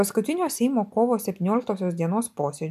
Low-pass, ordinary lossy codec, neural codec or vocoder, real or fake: 14.4 kHz; MP3, 96 kbps; none; real